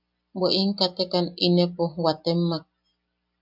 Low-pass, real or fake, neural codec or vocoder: 5.4 kHz; real; none